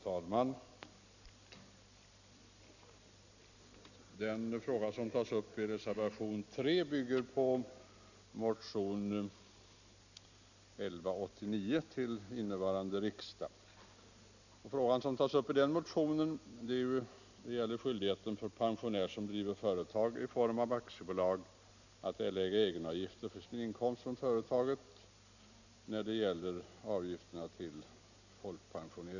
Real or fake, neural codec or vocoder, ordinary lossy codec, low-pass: real; none; none; 7.2 kHz